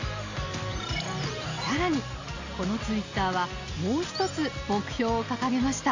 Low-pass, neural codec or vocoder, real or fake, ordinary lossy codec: 7.2 kHz; none; real; AAC, 48 kbps